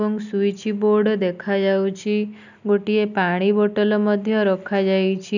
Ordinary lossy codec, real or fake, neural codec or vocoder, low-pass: none; real; none; 7.2 kHz